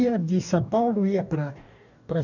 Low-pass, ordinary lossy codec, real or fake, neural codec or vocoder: 7.2 kHz; none; fake; codec, 44.1 kHz, 2.6 kbps, DAC